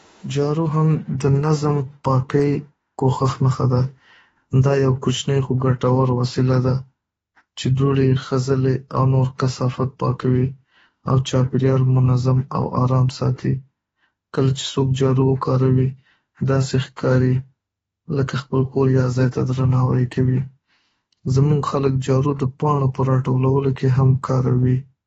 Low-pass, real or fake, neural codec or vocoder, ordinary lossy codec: 19.8 kHz; fake; autoencoder, 48 kHz, 32 numbers a frame, DAC-VAE, trained on Japanese speech; AAC, 24 kbps